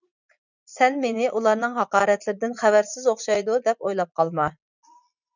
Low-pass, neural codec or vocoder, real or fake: 7.2 kHz; vocoder, 44.1 kHz, 80 mel bands, Vocos; fake